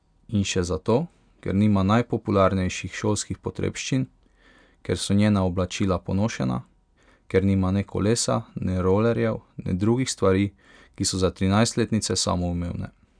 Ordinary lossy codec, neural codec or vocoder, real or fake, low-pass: none; none; real; 9.9 kHz